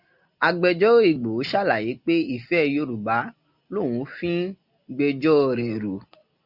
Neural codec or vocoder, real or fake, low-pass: none; real; 5.4 kHz